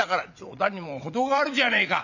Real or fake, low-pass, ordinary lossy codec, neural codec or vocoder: fake; 7.2 kHz; none; vocoder, 22.05 kHz, 80 mel bands, WaveNeXt